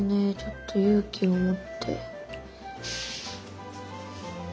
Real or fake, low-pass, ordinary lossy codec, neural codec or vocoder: real; none; none; none